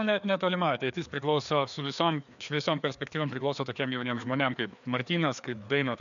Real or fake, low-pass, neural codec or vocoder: fake; 7.2 kHz; codec, 16 kHz, 2 kbps, FreqCodec, larger model